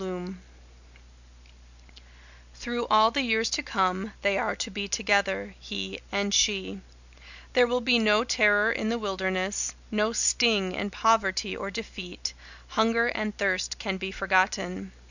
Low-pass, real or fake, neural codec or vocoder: 7.2 kHz; real; none